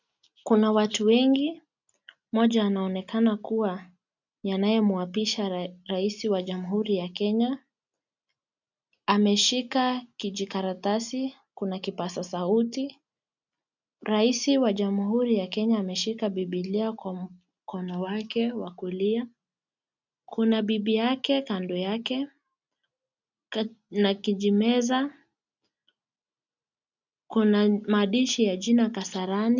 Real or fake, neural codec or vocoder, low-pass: real; none; 7.2 kHz